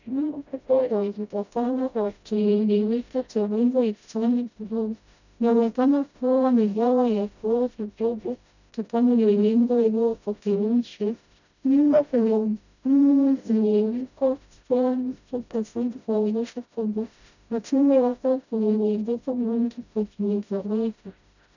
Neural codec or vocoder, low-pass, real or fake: codec, 16 kHz, 0.5 kbps, FreqCodec, smaller model; 7.2 kHz; fake